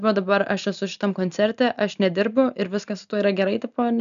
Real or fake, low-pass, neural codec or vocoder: real; 7.2 kHz; none